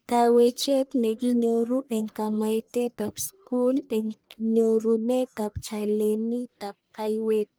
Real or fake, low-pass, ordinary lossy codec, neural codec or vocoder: fake; none; none; codec, 44.1 kHz, 1.7 kbps, Pupu-Codec